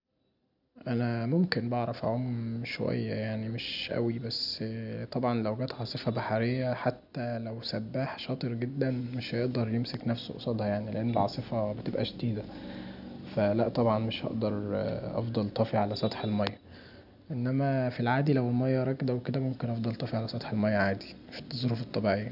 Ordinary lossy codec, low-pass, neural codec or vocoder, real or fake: none; 5.4 kHz; none; real